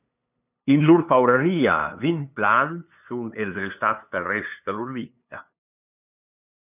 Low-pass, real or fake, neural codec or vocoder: 3.6 kHz; fake; codec, 16 kHz, 2 kbps, FunCodec, trained on LibriTTS, 25 frames a second